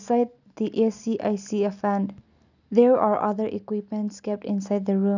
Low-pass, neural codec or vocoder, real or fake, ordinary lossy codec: 7.2 kHz; none; real; none